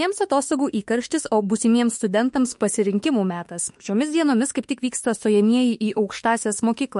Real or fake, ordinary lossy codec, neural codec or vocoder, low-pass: fake; MP3, 48 kbps; autoencoder, 48 kHz, 32 numbers a frame, DAC-VAE, trained on Japanese speech; 14.4 kHz